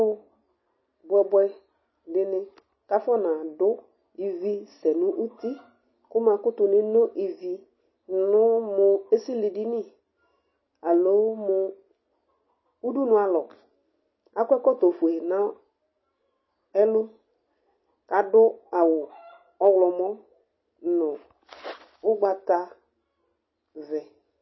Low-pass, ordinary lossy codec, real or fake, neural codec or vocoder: 7.2 kHz; MP3, 24 kbps; real; none